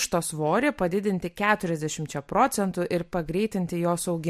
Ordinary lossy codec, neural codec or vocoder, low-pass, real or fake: MP3, 64 kbps; none; 14.4 kHz; real